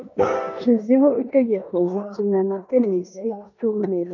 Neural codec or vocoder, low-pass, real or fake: codec, 16 kHz in and 24 kHz out, 0.9 kbps, LongCat-Audio-Codec, four codebook decoder; 7.2 kHz; fake